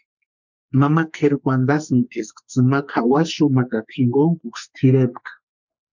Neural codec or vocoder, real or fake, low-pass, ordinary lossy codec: codec, 44.1 kHz, 2.6 kbps, SNAC; fake; 7.2 kHz; MP3, 64 kbps